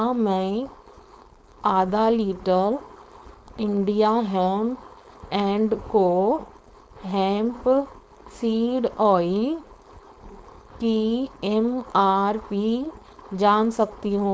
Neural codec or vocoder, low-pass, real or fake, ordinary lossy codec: codec, 16 kHz, 4.8 kbps, FACodec; none; fake; none